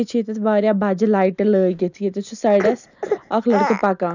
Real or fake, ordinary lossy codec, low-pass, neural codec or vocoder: real; none; 7.2 kHz; none